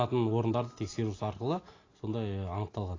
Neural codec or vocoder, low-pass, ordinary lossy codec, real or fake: none; 7.2 kHz; AAC, 32 kbps; real